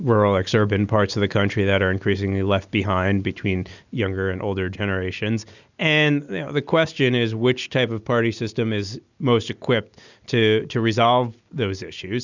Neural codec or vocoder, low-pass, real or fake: none; 7.2 kHz; real